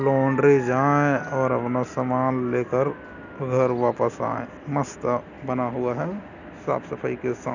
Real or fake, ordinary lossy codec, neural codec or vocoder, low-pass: real; none; none; 7.2 kHz